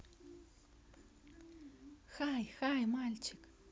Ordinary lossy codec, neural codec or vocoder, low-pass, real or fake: none; none; none; real